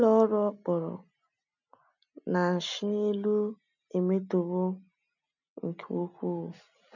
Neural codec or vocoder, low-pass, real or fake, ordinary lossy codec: none; 7.2 kHz; real; none